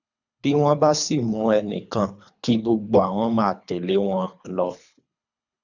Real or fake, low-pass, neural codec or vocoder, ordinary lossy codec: fake; 7.2 kHz; codec, 24 kHz, 3 kbps, HILCodec; none